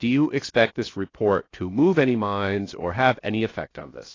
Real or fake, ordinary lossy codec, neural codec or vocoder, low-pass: fake; AAC, 32 kbps; codec, 16 kHz, 0.7 kbps, FocalCodec; 7.2 kHz